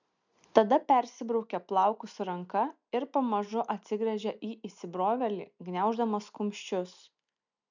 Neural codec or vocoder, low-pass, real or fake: none; 7.2 kHz; real